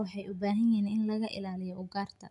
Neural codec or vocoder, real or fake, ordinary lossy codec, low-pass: none; real; none; 10.8 kHz